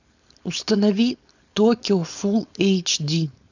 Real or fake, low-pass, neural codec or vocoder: fake; 7.2 kHz; codec, 16 kHz, 4.8 kbps, FACodec